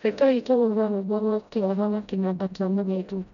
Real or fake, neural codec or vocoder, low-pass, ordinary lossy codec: fake; codec, 16 kHz, 0.5 kbps, FreqCodec, smaller model; 7.2 kHz; none